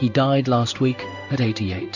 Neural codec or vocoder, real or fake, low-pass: codec, 16 kHz in and 24 kHz out, 1 kbps, XY-Tokenizer; fake; 7.2 kHz